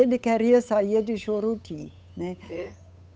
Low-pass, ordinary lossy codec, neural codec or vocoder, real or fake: none; none; codec, 16 kHz, 8 kbps, FunCodec, trained on Chinese and English, 25 frames a second; fake